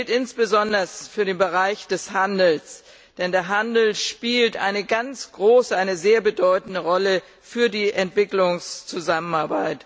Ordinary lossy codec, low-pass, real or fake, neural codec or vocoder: none; none; real; none